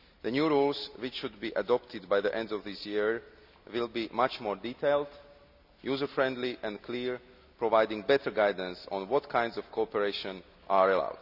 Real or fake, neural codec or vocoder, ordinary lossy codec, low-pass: real; none; none; 5.4 kHz